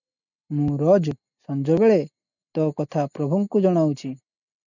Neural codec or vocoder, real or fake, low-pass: none; real; 7.2 kHz